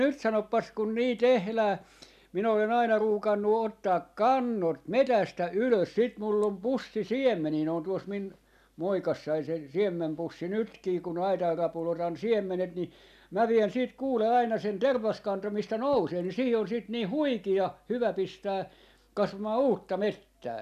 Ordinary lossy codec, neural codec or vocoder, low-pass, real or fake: none; none; 14.4 kHz; real